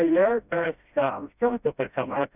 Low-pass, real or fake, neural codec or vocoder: 3.6 kHz; fake; codec, 16 kHz, 0.5 kbps, FreqCodec, smaller model